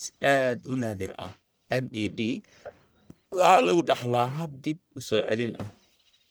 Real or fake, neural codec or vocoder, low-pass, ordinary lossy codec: fake; codec, 44.1 kHz, 1.7 kbps, Pupu-Codec; none; none